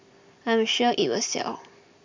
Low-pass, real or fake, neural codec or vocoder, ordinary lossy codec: 7.2 kHz; real; none; MP3, 64 kbps